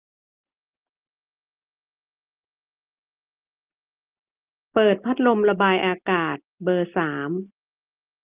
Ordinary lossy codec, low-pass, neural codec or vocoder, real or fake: Opus, 24 kbps; 3.6 kHz; none; real